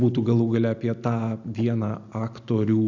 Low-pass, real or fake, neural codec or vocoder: 7.2 kHz; real; none